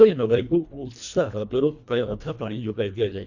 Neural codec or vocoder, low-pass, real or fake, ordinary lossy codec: codec, 24 kHz, 1.5 kbps, HILCodec; 7.2 kHz; fake; none